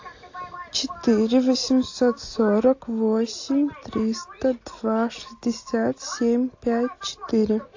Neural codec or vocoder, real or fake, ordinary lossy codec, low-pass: none; real; AAC, 48 kbps; 7.2 kHz